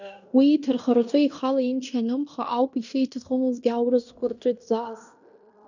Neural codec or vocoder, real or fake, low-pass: codec, 16 kHz in and 24 kHz out, 0.9 kbps, LongCat-Audio-Codec, fine tuned four codebook decoder; fake; 7.2 kHz